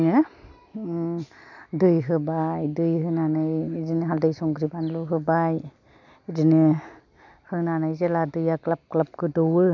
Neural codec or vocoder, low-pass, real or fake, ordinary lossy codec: none; 7.2 kHz; real; none